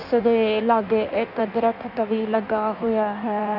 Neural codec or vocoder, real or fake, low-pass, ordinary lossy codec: codec, 16 kHz in and 24 kHz out, 1.1 kbps, FireRedTTS-2 codec; fake; 5.4 kHz; none